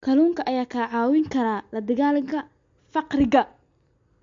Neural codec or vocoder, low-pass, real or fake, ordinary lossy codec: none; 7.2 kHz; real; MP3, 48 kbps